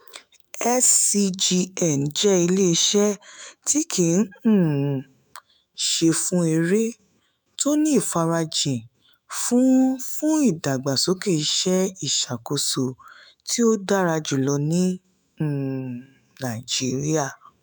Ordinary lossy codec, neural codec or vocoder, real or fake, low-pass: none; autoencoder, 48 kHz, 128 numbers a frame, DAC-VAE, trained on Japanese speech; fake; none